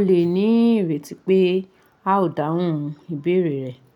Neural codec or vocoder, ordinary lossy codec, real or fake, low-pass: none; none; real; 19.8 kHz